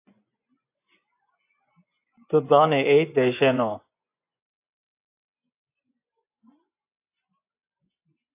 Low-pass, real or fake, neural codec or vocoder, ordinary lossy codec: 3.6 kHz; fake; vocoder, 24 kHz, 100 mel bands, Vocos; AAC, 24 kbps